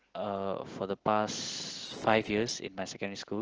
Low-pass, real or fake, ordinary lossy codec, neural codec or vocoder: 7.2 kHz; real; Opus, 16 kbps; none